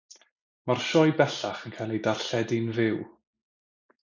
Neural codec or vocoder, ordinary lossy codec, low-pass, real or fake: none; MP3, 64 kbps; 7.2 kHz; real